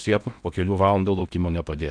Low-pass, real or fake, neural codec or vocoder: 9.9 kHz; fake; codec, 16 kHz in and 24 kHz out, 0.8 kbps, FocalCodec, streaming, 65536 codes